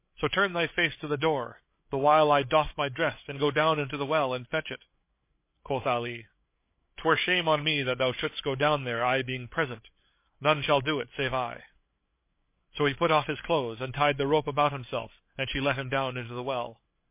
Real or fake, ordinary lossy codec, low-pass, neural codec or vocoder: fake; MP3, 24 kbps; 3.6 kHz; codec, 16 kHz, 8 kbps, FreqCodec, larger model